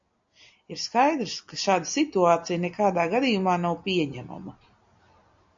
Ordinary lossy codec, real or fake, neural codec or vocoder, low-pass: AAC, 64 kbps; real; none; 7.2 kHz